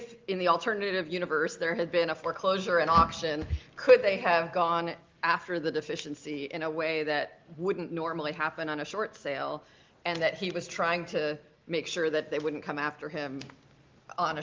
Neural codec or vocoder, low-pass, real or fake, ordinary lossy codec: none; 7.2 kHz; real; Opus, 32 kbps